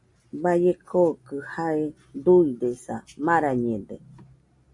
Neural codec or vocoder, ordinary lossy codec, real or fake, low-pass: none; MP3, 64 kbps; real; 10.8 kHz